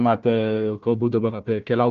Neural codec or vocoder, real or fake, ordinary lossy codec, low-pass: codec, 16 kHz, 1 kbps, FunCodec, trained on Chinese and English, 50 frames a second; fake; Opus, 32 kbps; 7.2 kHz